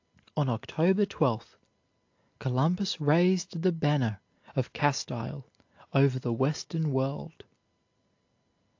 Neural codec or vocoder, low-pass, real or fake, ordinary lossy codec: none; 7.2 kHz; real; AAC, 48 kbps